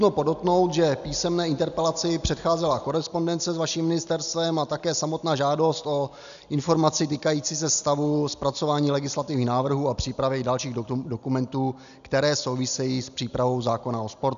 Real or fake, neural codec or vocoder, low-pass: real; none; 7.2 kHz